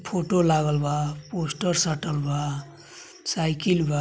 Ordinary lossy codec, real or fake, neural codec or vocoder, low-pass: none; real; none; none